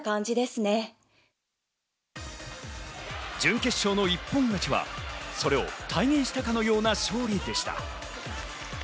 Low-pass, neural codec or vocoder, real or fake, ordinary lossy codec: none; none; real; none